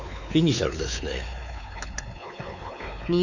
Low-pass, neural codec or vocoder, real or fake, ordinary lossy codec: 7.2 kHz; codec, 16 kHz, 4 kbps, X-Codec, WavLM features, trained on Multilingual LibriSpeech; fake; none